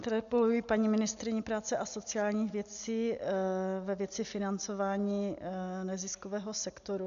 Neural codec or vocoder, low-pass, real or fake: none; 7.2 kHz; real